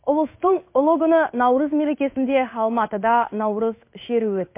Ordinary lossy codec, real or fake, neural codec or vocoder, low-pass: MP3, 24 kbps; fake; codec, 16 kHz in and 24 kHz out, 1 kbps, XY-Tokenizer; 3.6 kHz